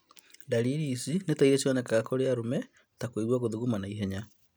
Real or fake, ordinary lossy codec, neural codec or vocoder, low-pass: real; none; none; none